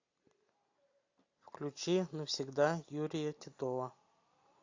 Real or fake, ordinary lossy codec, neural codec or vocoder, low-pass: real; AAC, 48 kbps; none; 7.2 kHz